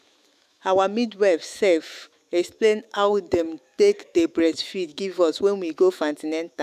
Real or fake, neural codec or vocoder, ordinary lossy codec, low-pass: fake; autoencoder, 48 kHz, 128 numbers a frame, DAC-VAE, trained on Japanese speech; none; 14.4 kHz